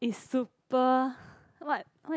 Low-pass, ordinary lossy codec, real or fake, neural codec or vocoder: none; none; real; none